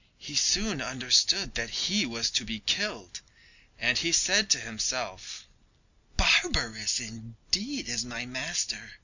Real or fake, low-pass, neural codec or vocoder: real; 7.2 kHz; none